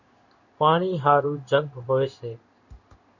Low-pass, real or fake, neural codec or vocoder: 7.2 kHz; fake; codec, 16 kHz in and 24 kHz out, 1 kbps, XY-Tokenizer